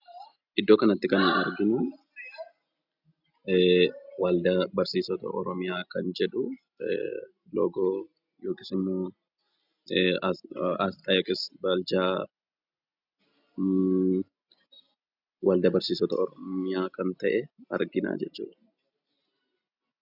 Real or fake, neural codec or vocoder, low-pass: real; none; 5.4 kHz